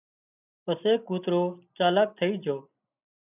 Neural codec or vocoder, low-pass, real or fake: none; 3.6 kHz; real